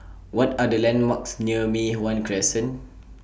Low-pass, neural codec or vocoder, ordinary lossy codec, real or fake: none; none; none; real